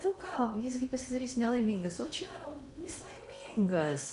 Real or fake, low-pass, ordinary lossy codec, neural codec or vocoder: fake; 10.8 kHz; MP3, 96 kbps; codec, 16 kHz in and 24 kHz out, 0.8 kbps, FocalCodec, streaming, 65536 codes